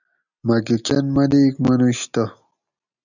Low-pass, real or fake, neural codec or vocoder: 7.2 kHz; real; none